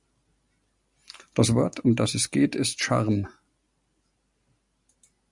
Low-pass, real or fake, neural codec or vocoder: 10.8 kHz; real; none